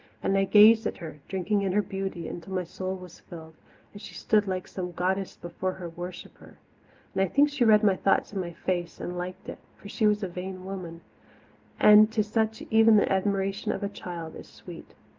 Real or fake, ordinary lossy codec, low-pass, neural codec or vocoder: real; Opus, 32 kbps; 7.2 kHz; none